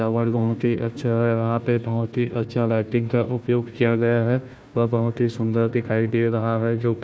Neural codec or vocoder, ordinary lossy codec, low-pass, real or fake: codec, 16 kHz, 1 kbps, FunCodec, trained on Chinese and English, 50 frames a second; none; none; fake